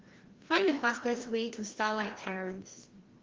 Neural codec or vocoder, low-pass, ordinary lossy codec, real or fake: codec, 16 kHz, 1 kbps, FreqCodec, larger model; 7.2 kHz; Opus, 16 kbps; fake